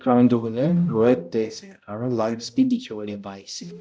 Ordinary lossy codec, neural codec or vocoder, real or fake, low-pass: none; codec, 16 kHz, 0.5 kbps, X-Codec, HuBERT features, trained on balanced general audio; fake; none